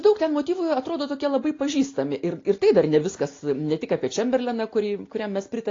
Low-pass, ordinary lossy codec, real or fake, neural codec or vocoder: 7.2 kHz; AAC, 32 kbps; real; none